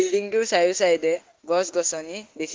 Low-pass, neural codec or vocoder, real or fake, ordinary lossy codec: 7.2 kHz; autoencoder, 48 kHz, 32 numbers a frame, DAC-VAE, trained on Japanese speech; fake; Opus, 16 kbps